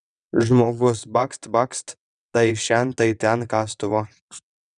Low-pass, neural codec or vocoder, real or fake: 9.9 kHz; vocoder, 22.05 kHz, 80 mel bands, WaveNeXt; fake